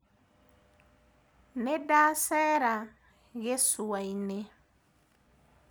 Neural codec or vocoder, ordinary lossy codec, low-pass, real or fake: none; none; none; real